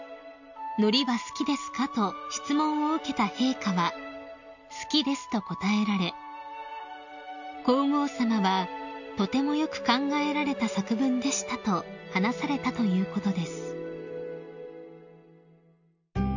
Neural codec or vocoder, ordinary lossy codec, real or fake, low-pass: none; none; real; 7.2 kHz